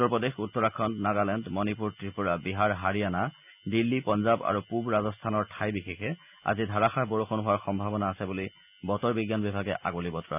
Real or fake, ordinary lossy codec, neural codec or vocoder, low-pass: real; none; none; 3.6 kHz